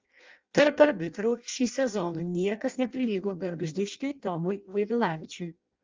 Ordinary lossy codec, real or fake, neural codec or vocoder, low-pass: Opus, 32 kbps; fake; codec, 16 kHz in and 24 kHz out, 0.6 kbps, FireRedTTS-2 codec; 7.2 kHz